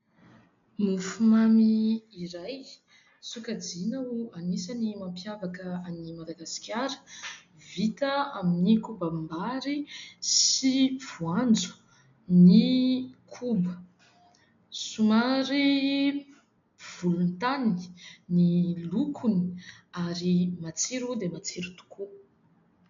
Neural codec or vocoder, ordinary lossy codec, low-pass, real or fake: none; MP3, 64 kbps; 7.2 kHz; real